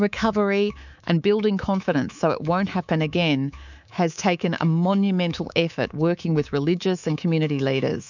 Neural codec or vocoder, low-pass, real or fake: codec, 24 kHz, 3.1 kbps, DualCodec; 7.2 kHz; fake